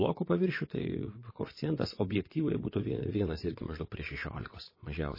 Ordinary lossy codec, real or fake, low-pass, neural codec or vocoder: MP3, 24 kbps; fake; 5.4 kHz; vocoder, 22.05 kHz, 80 mel bands, WaveNeXt